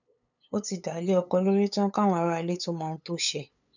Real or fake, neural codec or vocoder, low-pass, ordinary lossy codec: fake; codec, 16 kHz, 8 kbps, FunCodec, trained on LibriTTS, 25 frames a second; 7.2 kHz; none